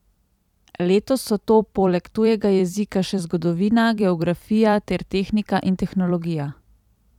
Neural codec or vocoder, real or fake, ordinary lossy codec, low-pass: vocoder, 44.1 kHz, 128 mel bands every 256 samples, BigVGAN v2; fake; none; 19.8 kHz